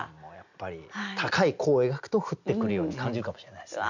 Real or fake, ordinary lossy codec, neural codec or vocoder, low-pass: real; none; none; 7.2 kHz